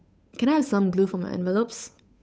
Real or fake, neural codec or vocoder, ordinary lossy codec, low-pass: fake; codec, 16 kHz, 8 kbps, FunCodec, trained on Chinese and English, 25 frames a second; none; none